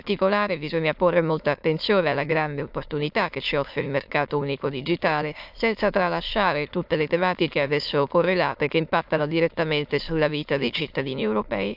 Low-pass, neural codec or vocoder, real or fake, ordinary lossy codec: 5.4 kHz; autoencoder, 22.05 kHz, a latent of 192 numbers a frame, VITS, trained on many speakers; fake; AAC, 48 kbps